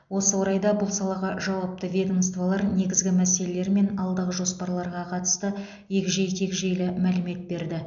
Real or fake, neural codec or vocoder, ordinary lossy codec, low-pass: real; none; none; 7.2 kHz